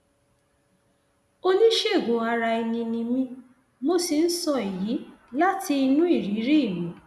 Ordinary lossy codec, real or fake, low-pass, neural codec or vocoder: none; real; none; none